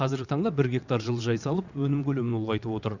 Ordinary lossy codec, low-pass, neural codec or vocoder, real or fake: none; 7.2 kHz; vocoder, 22.05 kHz, 80 mel bands, WaveNeXt; fake